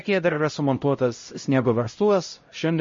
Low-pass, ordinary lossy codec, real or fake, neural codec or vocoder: 7.2 kHz; MP3, 32 kbps; fake; codec, 16 kHz, 0.5 kbps, X-Codec, HuBERT features, trained on LibriSpeech